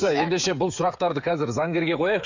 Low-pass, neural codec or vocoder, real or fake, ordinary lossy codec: 7.2 kHz; codec, 16 kHz, 8 kbps, FreqCodec, smaller model; fake; none